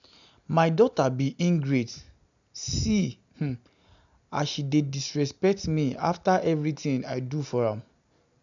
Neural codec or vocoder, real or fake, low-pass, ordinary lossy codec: none; real; 7.2 kHz; none